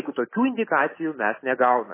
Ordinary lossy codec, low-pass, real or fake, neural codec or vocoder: MP3, 16 kbps; 3.6 kHz; real; none